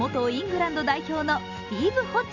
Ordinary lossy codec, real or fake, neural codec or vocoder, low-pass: none; real; none; 7.2 kHz